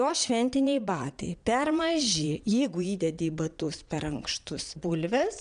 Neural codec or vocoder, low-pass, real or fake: vocoder, 22.05 kHz, 80 mel bands, Vocos; 9.9 kHz; fake